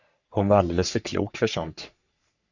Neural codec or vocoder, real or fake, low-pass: codec, 44.1 kHz, 3.4 kbps, Pupu-Codec; fake; 7.2 kHz